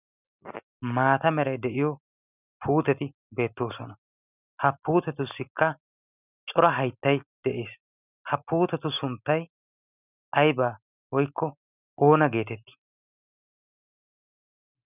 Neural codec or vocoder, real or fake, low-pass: none; real; 3.6 kHz